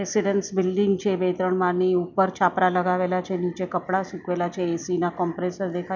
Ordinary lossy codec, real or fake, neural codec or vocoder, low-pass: none; real; none; 7.2 kHz